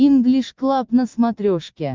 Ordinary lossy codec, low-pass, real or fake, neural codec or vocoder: Opus, 32 kbps; 7.2 kHz; real; none